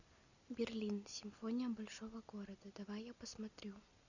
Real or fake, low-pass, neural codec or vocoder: real; 7.2 kHz; none